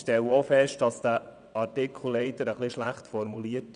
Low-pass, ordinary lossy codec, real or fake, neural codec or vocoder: 9.9 kHz; none; fake; vocoder, 22.05 kHz, 80 mel bands, WaveNeXt